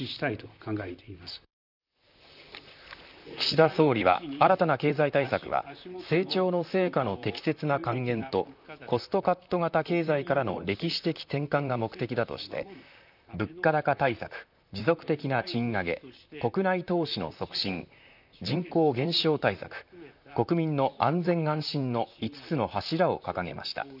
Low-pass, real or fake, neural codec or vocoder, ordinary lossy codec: 5.4 kHz; fake; vocoder, 44.1 kHz, 128 mel bands, Pupu-Vocoder; none